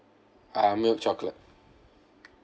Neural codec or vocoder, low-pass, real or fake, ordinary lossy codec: none; none; real; none